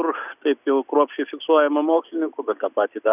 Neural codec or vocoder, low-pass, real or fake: none; 3.6 kHz; real